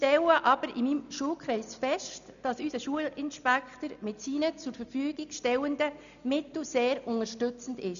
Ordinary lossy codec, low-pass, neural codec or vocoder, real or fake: none; 7.2 kHz; none; real